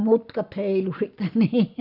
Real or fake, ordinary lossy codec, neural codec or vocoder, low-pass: real; none; none; 5.4 kHz